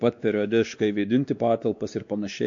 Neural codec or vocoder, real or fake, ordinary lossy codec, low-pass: codec, 16 kHz, 2 kbps, X-Codec, WavLM features, trained on Multilingual LibriSpeech; fake; MP3, 48 kbps; 7.2 kHz